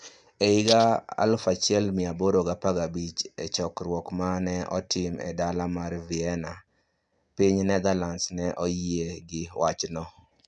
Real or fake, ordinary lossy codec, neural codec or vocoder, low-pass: real; none; none; 9.9 kHz